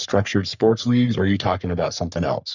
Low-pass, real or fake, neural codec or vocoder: 7.2 kHz; fake; codec, 44.1 kHz, 3.4 kbps, Pupu-Codec